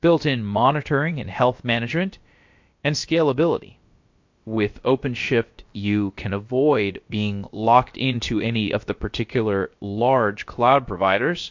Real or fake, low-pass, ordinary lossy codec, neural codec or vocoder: fake; 7.2 kHz; MP3, 48 kbps; codec, 16 kHz, about 1 kbps, DyCAST, with the encoder's durations